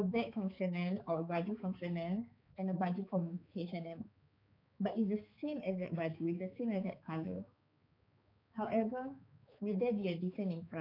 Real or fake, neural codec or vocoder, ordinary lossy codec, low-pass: fake; codec, 16 kHz, 4 kbps, X-Codec, HuBERT features, trained on balanced general audio; none; 5.4 kHz